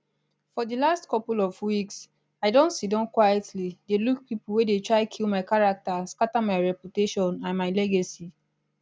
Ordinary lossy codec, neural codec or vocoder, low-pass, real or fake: none; none; none; real